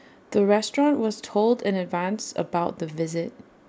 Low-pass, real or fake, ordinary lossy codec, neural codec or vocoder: none; real; none; none